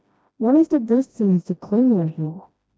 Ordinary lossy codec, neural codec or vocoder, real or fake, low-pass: none; codec, 16 kHz, 1 kbps, FreqCodec, smaller model; fake; none